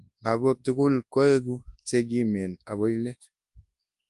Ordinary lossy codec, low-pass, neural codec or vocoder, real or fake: Opus, 32 kbps; 10.8 kHz; codec, 24 kHz, 0.9 kbps, WavTokenizer, large speech release; fake